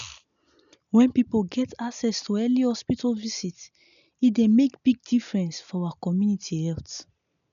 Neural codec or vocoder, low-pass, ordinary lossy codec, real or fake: none; 7.2 kHz; none; real